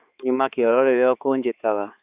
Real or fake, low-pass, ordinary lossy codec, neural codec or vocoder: fake; 3.6 kHz; Opus, 64 kbps; codec, 16 kHz, 4 kbps, X-Codec, HuBERT features, trained on balanced general audio